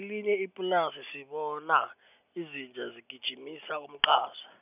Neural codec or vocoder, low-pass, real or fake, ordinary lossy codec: none; 3.6 kHz; real; none